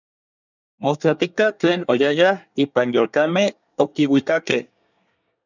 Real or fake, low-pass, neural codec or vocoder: fake; 7.2 kHz; codec, 24 kHz, 1 kbps, SNAC